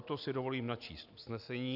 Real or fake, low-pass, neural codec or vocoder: real; 5.4 kHz; none